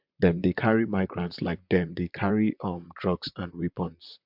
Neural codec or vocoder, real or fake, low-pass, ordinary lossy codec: vocoder, 44.1 kHz, 128 mel bands, Pupu-Vocoder; fake; 5.4 kHz; MP3, 48 kbps